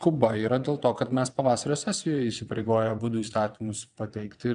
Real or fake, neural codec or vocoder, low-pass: fake; vocoder, 22.05 kHz, 80 mel bands, WaveNeXt; 9.9 kHz